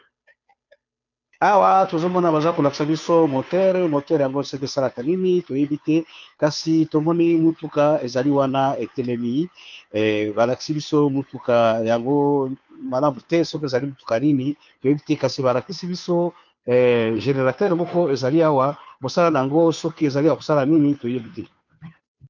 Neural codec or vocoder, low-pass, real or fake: codec, 16 kHz, 2 kbps, FunCodec, trained on Chinese and English, 25 frames a second; 7.2 kHz; fake